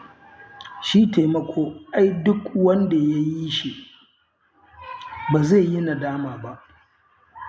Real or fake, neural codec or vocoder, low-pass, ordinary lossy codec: real; none; none; none